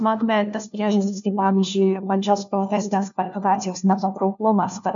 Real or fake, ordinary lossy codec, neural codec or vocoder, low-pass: fake; MP3, 64 kbps; codec, 16 kHz, 1 kbps, FunCodec, trained on LibriTTS, 50 frames a second; 7.2 kHz